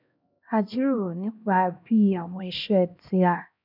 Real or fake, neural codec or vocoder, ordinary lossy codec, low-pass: fake; codec, 16 kHz, 1 kbps, X-Codec, HuBERT features, trained on LibriSpeech; none; 5.4 kHz